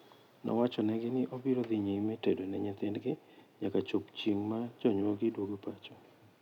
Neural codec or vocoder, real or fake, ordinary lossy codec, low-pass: none; real; none; 19.8 kHz